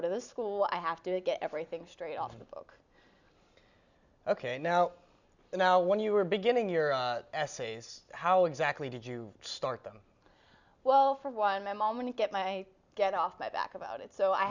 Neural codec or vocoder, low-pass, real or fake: none; 7.2 kHz; real